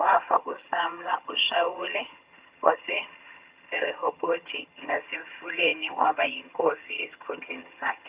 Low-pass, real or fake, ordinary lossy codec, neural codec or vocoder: 3.6 kHz; fake; Opus, 64 kbps; vocoder, 22.05 kHz, 80 mel bands, HiFi-GAN